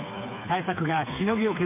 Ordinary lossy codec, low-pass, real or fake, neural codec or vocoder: MP3, 24 kbps; 3.6 kHz; fake; codec, 16 kHz, 4 kbps, FreqCodec, smaller model